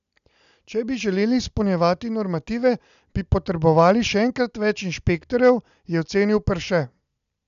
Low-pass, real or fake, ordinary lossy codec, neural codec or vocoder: 7.2 kHz; real; none; none